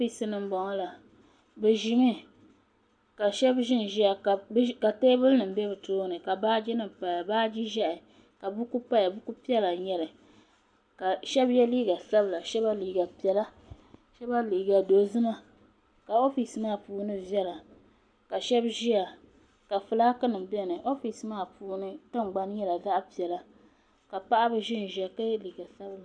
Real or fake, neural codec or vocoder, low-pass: real; none; 9.9 kHz